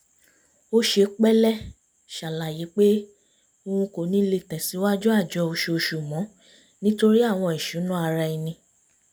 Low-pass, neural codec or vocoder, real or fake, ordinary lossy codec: none; none; real; none